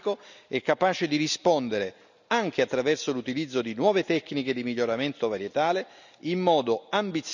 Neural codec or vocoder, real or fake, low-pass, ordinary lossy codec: none; real; 7.2 kHz; none